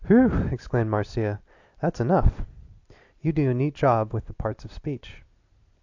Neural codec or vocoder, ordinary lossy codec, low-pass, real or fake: none; AAC, 48 kbps; 7.2 kHz; real